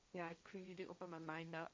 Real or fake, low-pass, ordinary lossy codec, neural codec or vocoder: fake; none; none; codec, 16 kHz, 1.1 kbps, Voila-Tokenizer